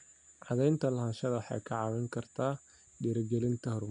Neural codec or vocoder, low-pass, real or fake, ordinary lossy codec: none; 9.9 kHz; real; none